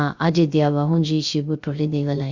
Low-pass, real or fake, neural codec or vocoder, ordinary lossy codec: 7.2 kHz; fake; codec, 16 kHz, 0.3 kbps, FocalCodec; Opus, 64 kbps